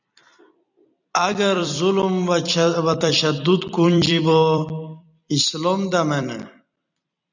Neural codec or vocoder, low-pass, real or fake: vocoder, 44.1 kHz, 128 mel bands every 256 samples, BigVGAN v2; 7.2 kHz; fake